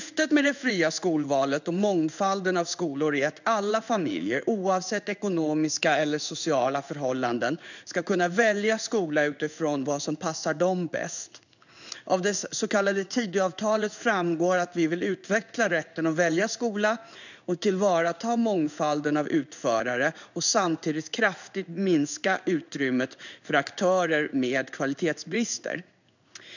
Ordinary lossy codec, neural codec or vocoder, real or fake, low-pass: none; codec, 16 kHz in and 24 kHz out, 1 kbps, XY-Tokenizer; fake; 7.2 kHz